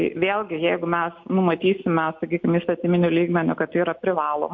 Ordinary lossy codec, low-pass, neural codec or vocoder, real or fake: MP3, 48 kbps; 7.2 kHz; none; real